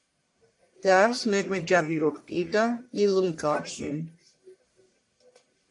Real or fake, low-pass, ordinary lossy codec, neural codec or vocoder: fake; 10.8 kHz; AAC, 64 kbps; codec, 44.1 kHz, 1.7 kbps, Pupu-Codec